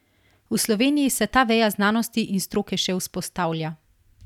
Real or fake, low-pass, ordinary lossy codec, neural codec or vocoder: real; 19.8 kHz; none; none